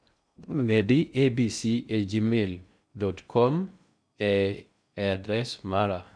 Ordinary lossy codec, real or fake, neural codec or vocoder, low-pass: none; fake; codec, 16 kHz in and 24 kHz out, 0.6 kbps, FocalCodec, streaming, 2048 codes; 9.9 kHz